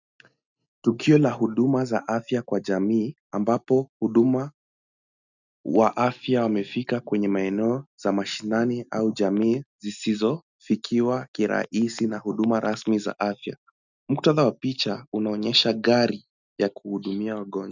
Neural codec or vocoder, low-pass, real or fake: none; 7.2 kHz; real